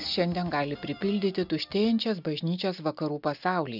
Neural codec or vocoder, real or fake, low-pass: none; real; 5.4 kHz